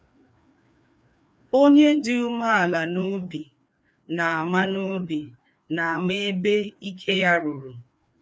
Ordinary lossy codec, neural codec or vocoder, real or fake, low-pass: none; codec, 16 kHz, 2 kbps, FreqCodec, larger model; fake; none